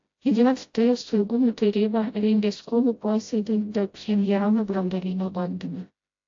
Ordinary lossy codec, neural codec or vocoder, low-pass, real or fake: AAC, 48 kbps; codec, 16 kHz, 0.5 kbps, FreqCodec, smaller model; 7.2 kHz; fake